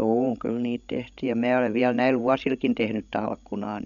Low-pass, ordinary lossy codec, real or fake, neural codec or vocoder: 7.2 kHz; none; fake; codec, 16 kHz, 16 kbps, FreqCodec, larger model